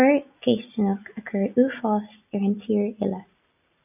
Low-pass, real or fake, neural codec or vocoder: 3.6 kHz; real; none